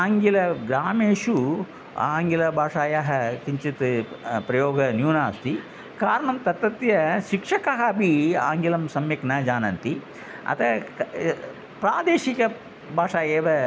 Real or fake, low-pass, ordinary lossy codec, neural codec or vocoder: real; none; none; none